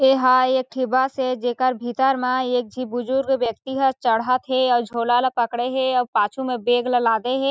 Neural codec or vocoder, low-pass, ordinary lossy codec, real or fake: none; none; none; real